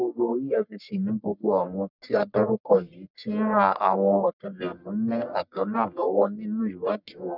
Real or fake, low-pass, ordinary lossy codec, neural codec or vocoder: fake; 5.4 kHz; none; codec, 44.1 kHz, 1.7 kbps, Pupu-Codec